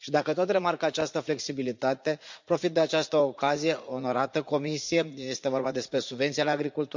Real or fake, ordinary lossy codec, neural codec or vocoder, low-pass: fake; MP3, 64 kbps; vocoder, 44.1 kHz, 80 mel bands, Vocos; 7.2 kHz